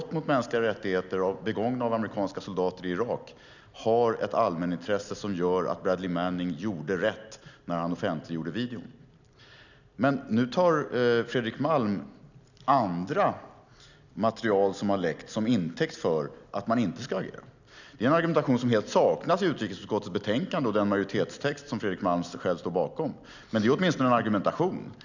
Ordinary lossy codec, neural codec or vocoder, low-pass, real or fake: none; none; 7.2 kHz; real